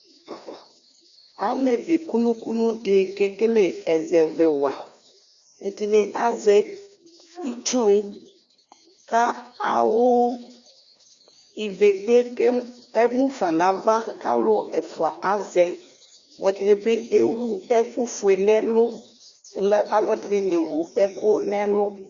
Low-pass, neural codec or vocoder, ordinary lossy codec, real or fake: 7.2 kHz; codec, 16 kHz, 1 kbps, FreqCodec, larger model; Opus, 64 kbps; fake